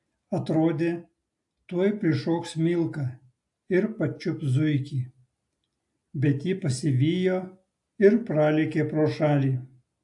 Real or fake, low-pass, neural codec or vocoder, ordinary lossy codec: real; 10.8 kHz; none; AAC, 64 kbps